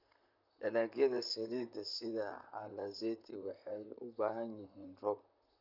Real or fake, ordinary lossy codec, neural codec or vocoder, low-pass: fake; none; vocoder, 44.1 kHz, 128 mel bands, Pupu-Vocoder; 5.4 kHz